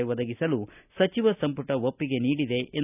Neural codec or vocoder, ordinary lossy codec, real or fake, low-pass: none; none; real; 3.6 kHz